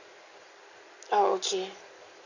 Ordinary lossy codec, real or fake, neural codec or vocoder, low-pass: none; real; none; 7.2 kHz